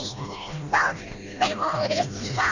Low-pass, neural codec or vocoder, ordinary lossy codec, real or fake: 7.2 kHz; codec, 16 kHz, 1 kbps, FreqCodec, smaller model; none; fake